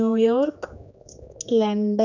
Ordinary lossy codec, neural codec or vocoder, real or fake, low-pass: none; codec, 16 kHz, 2 kbps, X-Codec, HuBERT features, trained on general audio; fake; 7.2 kHz